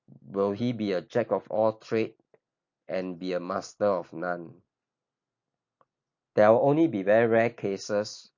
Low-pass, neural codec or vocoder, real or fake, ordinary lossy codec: 7.2 kHz; none; real; MP3, 48 kbps